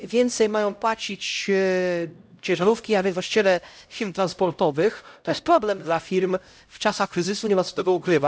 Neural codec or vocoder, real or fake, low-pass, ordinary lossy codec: codec, 16 kHz, 0.5 kbps, X-Codec, HuBERT features, trained on LibriSpeech; fake; none; none